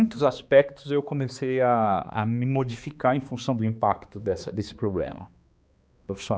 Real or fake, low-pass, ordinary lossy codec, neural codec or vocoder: fake; none; none; codec, 16 kHz, 2 kbps, X-Codec, HuBERT features, trained on balanced general audio